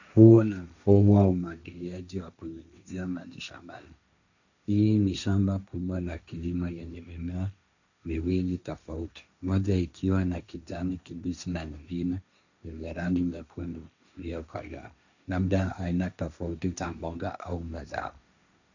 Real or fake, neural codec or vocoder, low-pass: fake; codec, 16 kHz, 1.1 kbps, Voila-Tokenizer; 7.2 kHz